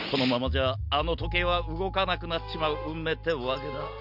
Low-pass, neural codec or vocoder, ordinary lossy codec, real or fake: 5.4 kHz; none; none; real